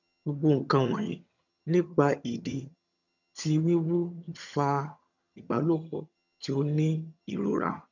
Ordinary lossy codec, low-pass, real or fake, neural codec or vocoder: none; 7.2 kHz; fake; vocoder, 22.05 kHz, 80 mel bands, HiFi-GAN